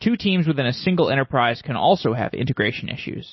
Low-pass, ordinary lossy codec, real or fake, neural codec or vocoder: 7.2 kHz; MP3, 24 kbps; real; none